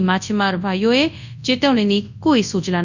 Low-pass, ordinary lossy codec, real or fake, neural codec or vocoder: 7.2 kHz; none; fake; codec, 24 kHz, 0.9 kbps, WavTokenizer, large speech release